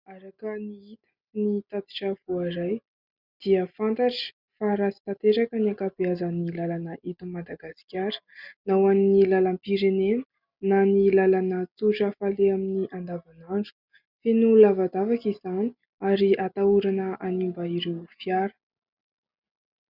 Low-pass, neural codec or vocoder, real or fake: 5.4 kHz; none; real